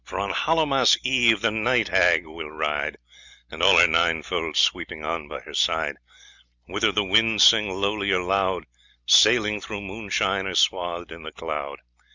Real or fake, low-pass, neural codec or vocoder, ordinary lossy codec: real; 7.2 kHz; none; Opus, 64 kbps